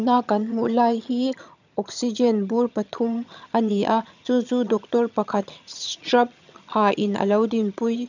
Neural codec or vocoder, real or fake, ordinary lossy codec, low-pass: vocoder, 22.05 kHz, 80 mel bands, HiFi-GAN; fake; none; 7.2 kHz